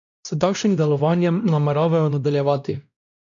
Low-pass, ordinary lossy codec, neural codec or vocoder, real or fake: 7.2 kHz; none; codec, 16 kHz, 1 kbps, X-Codec, WavLM features, trained on Multilingual LibriSpeech; fake